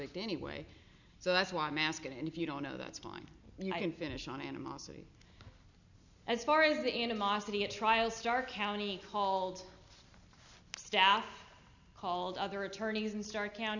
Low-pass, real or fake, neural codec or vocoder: 7.2 kHz; real; none